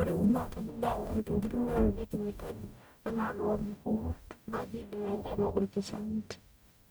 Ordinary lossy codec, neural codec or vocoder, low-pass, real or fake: none; codec, 44.1 kHz, 0.9 kbps, DAC; none; fake